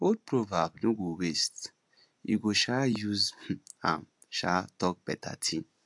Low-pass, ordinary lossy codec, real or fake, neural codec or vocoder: 10.8 kHz; AAC, 64 kbps; real; none